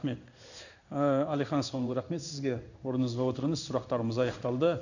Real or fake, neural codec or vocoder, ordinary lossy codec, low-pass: fake; codec, 16 kHz in and 24 kHz out, 1 kbps, XY-Tokenizer; none; 7.2 kHz